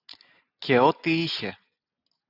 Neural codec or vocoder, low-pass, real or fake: none; 5.4 kHz; real